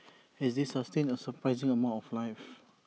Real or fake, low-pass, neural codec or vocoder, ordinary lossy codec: real; none; none; none